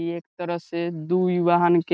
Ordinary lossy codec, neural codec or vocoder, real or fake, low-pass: none; none; real; none